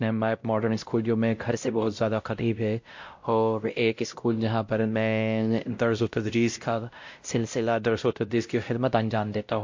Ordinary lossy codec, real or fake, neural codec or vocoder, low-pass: MP3, 48 kbps; fake; codec, 16 kHz, 0.5 kbps, X-Codec, WavLM features, trained on Multilingual LibriSpeech; 7.2 kHz